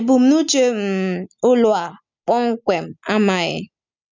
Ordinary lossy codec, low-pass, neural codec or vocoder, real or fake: none; 7.2 kHz; none; real